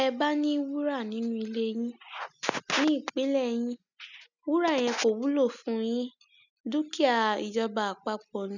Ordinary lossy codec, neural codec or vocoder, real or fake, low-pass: none; none; real; 7.2 kHz